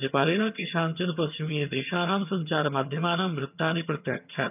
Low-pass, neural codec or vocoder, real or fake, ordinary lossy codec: 3.6 kHz; vocoder, 22.05 kHz, 80 mel bands, HiFi-GAN; fake; none